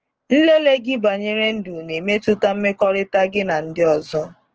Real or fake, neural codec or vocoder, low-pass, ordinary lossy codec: fake; codec, 16 kHz, 6 kbps, DAC; 7.2 kHz; Opus, 16 kbps